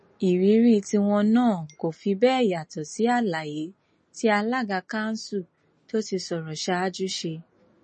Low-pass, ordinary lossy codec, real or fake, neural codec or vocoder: 10.8 kHz; MP3, 32 kbps; real; none